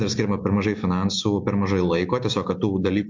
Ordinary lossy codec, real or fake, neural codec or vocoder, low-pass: MP3, 48 kbps; real; none; 7.2 kHz